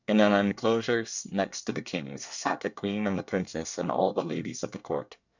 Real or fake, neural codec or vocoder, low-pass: fake; codec, 24 kHz, 1 kbps, SNAC; 7.2 kHz